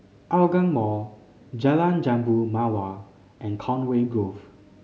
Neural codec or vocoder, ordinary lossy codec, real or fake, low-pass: none; none; real; none